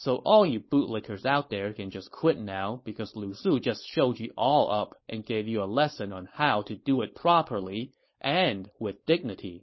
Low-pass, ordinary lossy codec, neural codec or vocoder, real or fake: 7.2 kHz; MP3, 24 kbps; codec, 16 kHz, 4.8 kbps, FACodec; fake